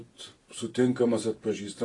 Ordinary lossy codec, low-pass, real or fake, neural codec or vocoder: AAC, 32 kbps; 10.8 kHz; real; none